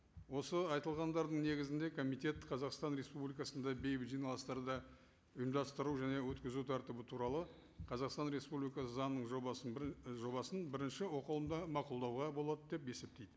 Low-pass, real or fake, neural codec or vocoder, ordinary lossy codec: none; real; none; none